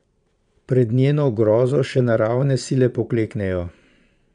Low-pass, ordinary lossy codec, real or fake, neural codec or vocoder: 9.9 kHz; none; fake; vocoder, 22.05 kHz, 80 mel bands, Vocos